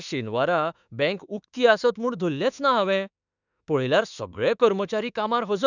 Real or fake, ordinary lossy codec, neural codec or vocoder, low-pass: fake; none; autoencoder, 48 kHz, 32 numbers a frame, DAC-VAE, trained on Japanese speech; 7.2 kHz